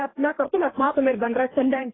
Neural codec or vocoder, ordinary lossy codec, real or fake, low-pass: codec, 16 kHz, 2 kbps, FreqCodec, larger model; AAC, 16 kbps; fake; 7.2 kHz